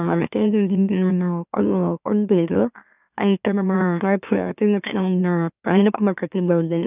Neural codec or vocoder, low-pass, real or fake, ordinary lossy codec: autoencoder, 44.1 kHz, a latent of 192 numbers a frame, MeloTTS; 3.6 kHz; fake; none